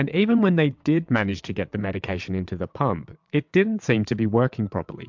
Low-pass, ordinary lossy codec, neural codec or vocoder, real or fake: 7.2 kHz; MP3, 64 kbps; vocoder, 22.05 kHz, 80 mel bands, WaveNeXt; fake